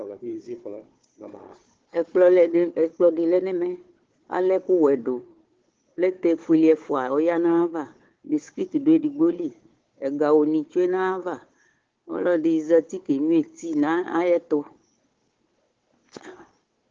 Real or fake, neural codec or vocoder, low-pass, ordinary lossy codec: fake; codec, 16 kHz, 4 kbps, FunCodec, trained on Chinese and English, 50 frames a second; 7.2 kHz; Opus, 16 kbps